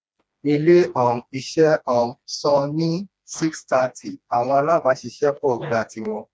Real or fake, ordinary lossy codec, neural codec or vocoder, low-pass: fake; none; codec, 16 kHz, 2 kbps, FreqCodec, smaller model; none